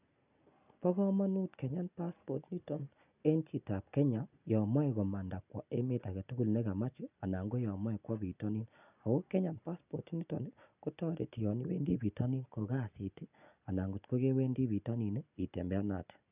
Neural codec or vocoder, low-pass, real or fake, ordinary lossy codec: none; 3.6 kHz; real; none